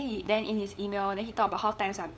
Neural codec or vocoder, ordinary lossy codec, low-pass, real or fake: codec, 16 kHz, 8 kbps, FunCodec, trained on LibriTTS, 25 frames a second; none; none; fake